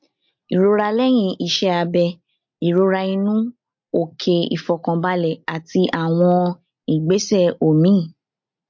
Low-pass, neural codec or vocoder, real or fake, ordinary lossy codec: 7.2 kHz; none; real; MP3, 48 kbps